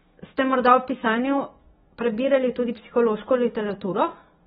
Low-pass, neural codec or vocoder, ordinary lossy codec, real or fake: 19.8 kHz; autoencoder, 48 kHz, 128 numbers a frame, DAC-VAE, trained on Japanese speech; AAC, 16 kbps; fake